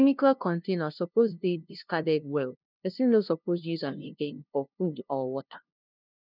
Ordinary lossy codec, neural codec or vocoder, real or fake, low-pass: none; codec, 16 kHz, 0.5 kbps, FunCodec, trained on LibriTTS, 25 frames a second; fake; 5.4 kHz